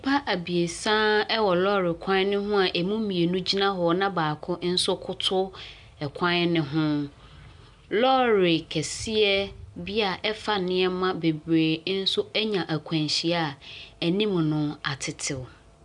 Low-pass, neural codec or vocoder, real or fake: 10.8 kHz; none; real